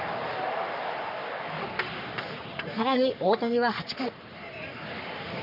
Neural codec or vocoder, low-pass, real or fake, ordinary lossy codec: codec, 44.1 kHz, 3.4 kbps, Pupu-Codec; 5.4 kHz; fake; none